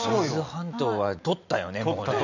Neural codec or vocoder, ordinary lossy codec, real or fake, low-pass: none; none; real; 7.2 kHz